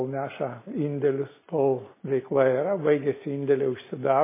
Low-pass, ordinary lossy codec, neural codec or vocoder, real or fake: 3.6 kHz; MP3, 16 kbps; none; real